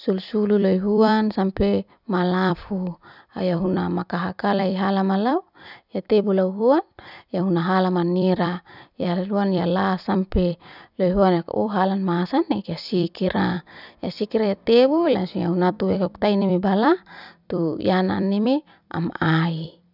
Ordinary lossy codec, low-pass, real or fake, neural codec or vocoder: none; 5.4 kHz; fake; vocoder, 44.1 kHz, 128 mel bands every 256 samples, BigVGAN v2